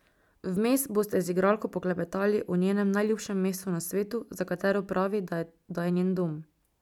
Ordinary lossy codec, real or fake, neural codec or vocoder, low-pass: none; real; none; 19.8 kHz